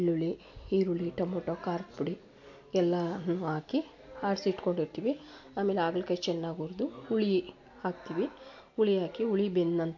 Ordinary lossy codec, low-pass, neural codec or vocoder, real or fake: AAC, 48 kbps; 7.2 kHz; none; real